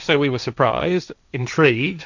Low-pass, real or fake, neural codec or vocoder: 7.2 kHz; fake; codec, 16 kHz, 1.1 kbps, Voila-Tokenizer